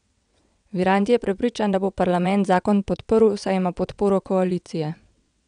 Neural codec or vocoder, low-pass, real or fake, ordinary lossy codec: vocoder, 22.05 kHz, 80 mel bands, WaveNeXt; 9.9 kHz; fake; none